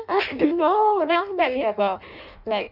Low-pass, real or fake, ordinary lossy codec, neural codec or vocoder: 5.4 kHz; fake; none; codec, 16 kHz in and 24 kHz out, 0.6 kbps, FireRedTTS-2 codec